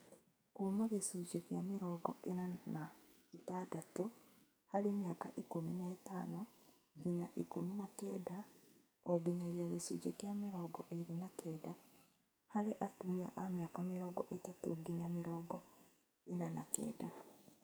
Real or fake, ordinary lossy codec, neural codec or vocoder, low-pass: fake; none; codec, 44.1 kHz, 2.6 kbps, SNAC; none